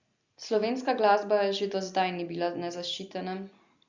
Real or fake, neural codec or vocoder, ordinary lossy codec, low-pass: real; none; Opus, 64 kbps; 7.2 kHz